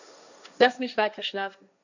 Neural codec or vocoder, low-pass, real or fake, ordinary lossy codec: codec, 16 kHz, 1.1 kbps, Voila-Tokenizer; 7.2 kHz; fake; none